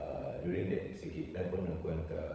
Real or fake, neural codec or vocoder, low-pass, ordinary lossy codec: fake; codec, 16 kHz, 16 kbps, FunCodec, trained on LibriTTS, 50 frames a second; none; none